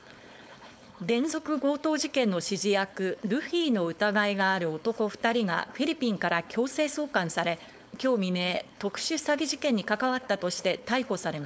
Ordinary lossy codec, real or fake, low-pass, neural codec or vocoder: none; fake; none; codec, 16 kHz, 4.8 kbps, FACodec